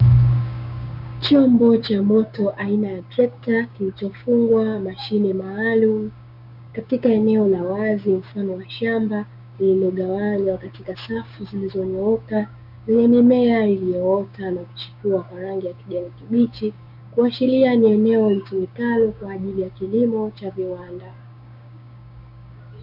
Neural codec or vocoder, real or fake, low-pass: codec, 16 kHz, 6 kbps, DAC; fake; 5.4 kHz